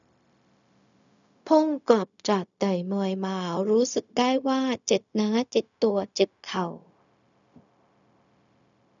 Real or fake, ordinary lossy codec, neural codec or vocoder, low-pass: fake; none; codec, 16 kHz, 0.4 kbps, LongCat-Audio-Codec; 7.2 kHz